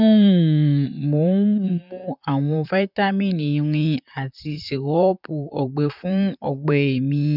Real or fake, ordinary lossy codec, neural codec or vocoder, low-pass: fake; none; vocoder, 44.1 kHz, 128 mel bands, Pupu-Vocoder; 5.4 kHz